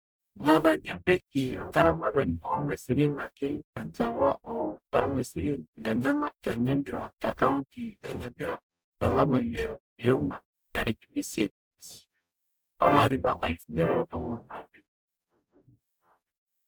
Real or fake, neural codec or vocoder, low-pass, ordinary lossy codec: fake; codec, 44.1 kHz, 0.9 kbps, DAC; none; none